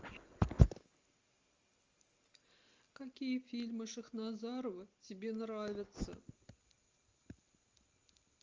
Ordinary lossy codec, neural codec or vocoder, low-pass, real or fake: Opus, 32 kbps; none; 7.2 kHz; real